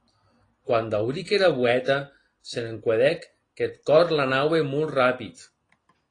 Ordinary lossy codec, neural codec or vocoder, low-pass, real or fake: AAC, 32 kbps; none; 9.9 kHz; real